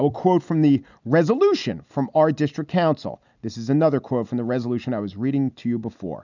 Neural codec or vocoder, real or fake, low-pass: none; real; 7.2 kHz